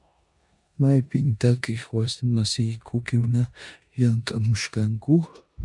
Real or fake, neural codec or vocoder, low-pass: fake; codec, 16 kHz in and 24 kHz out, 0.9 kbps, LongCat-Audio-Codec, four codebook decoder; 10.8 kHz